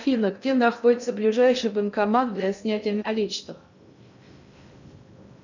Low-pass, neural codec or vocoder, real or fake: 7.2 kHz; codec, 16 kHz in and 24 kHz out, 0.6 kbps, FocalCodec, streaming, 2048 codes; fake